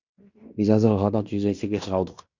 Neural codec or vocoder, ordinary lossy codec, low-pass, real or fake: codec, 16 kHz in and 24 kHz out, 0.9 kbps, LongCat-Audio-Codec, fine tuned four codebook decoder; Opus, 64 kbps; 7.2 kHz; fake